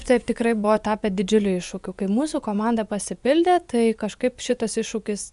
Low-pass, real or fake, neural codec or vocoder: 10.8 kHz; fake; vocoder, 24 kHz, 100 mel bands, Vocos